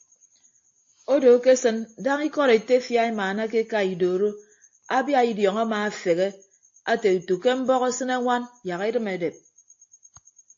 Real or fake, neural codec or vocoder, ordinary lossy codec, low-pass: real; none; AAC, 48 kbps; 7.2 kHz